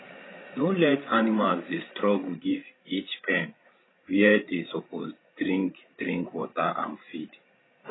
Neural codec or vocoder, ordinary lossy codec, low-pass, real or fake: codec, 16 kHz, 16 kbps, FreqCodec, larger model; AAC, 16 kbps; 7.2 kHz; fake